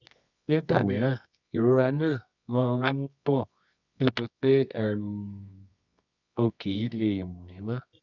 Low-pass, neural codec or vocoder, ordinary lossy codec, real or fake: 7.2 kHz; codec, 24 kHz, 0.9 kbps, WavTokenizer, medium music audio release; none; fake